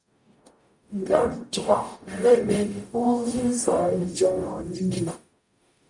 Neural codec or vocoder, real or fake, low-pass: codec, 44.1 kHz, 0.9 kbps, DAC; fake; 10.8 kHz